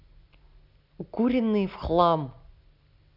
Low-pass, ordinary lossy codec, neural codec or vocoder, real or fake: 5.4 kHz; none; none; real